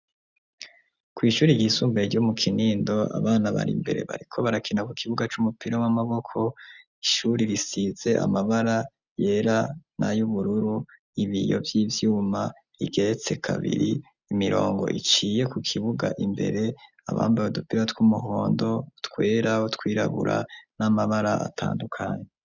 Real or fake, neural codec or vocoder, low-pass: real; none; 7.2 kHz